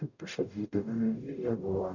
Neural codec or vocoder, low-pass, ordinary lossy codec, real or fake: codec, 44.1 kHz, 0.9 kbps, DAC; 7.2 kHz; none; fake